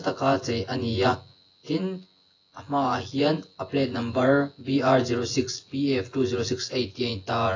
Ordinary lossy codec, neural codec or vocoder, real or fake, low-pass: AAC, 32 kbps; vocoder, 24 kHz, 100 mel bands, Vocos; fake; 7.2 kHz